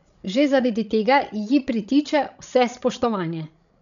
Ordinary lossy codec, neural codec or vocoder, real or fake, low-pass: none; codec, 16 kHz, 16 kbps, FreqCodec, larger model; fake; 7.2 kHz